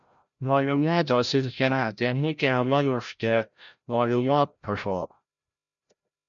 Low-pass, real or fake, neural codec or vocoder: 7.2 kHz; fake; codec, 16 kHz, 0.5 kbps, FreqCodec, larger model